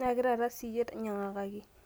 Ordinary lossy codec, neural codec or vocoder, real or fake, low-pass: none; none; real; none